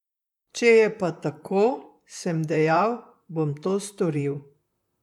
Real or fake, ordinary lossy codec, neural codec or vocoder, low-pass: fake; none; vocoder, 44.1 kHz, 128 mel bands, Pupu-Vocoder; 19.8 kHz